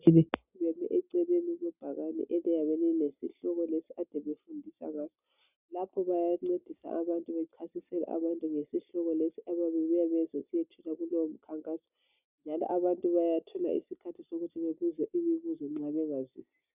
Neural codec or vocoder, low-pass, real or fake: none; 3.6 kHz; real